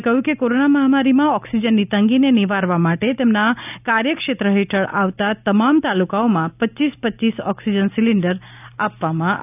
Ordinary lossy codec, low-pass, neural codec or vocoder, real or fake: none; 3.6 kHz; none; real